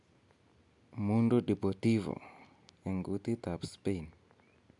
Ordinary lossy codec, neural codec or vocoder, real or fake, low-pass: none; none; real; 10.8 kHz